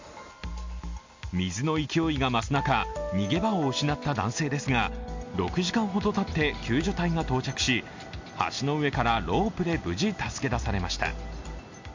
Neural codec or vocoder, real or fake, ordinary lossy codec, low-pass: none; real; MP3, 48 kbps; 7.2 kHz